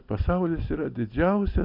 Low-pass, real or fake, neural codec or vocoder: 5.4 kHz; real; none